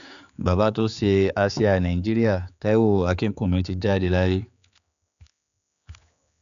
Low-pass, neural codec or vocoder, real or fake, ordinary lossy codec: 7.2 kHz; codec, 16 kHz, 4 kbps, X-Codec, HuBERT features, trained on general audio; fake; none